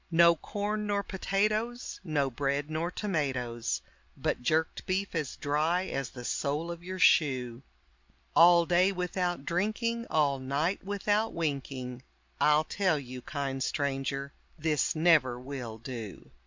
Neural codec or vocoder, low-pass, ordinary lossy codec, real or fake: none; 7.2 kHz; MP3, 64 kbps; real